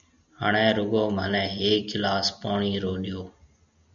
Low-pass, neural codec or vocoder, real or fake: 7.2 kHz; none; real